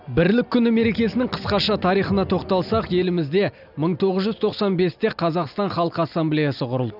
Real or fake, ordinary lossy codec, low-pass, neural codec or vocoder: real; none; 5.4 kHz; none